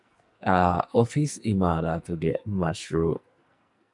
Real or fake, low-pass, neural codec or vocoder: fake; 10.8 kHz; codec, 44.1 kHz, 2.6 kbps, SNAC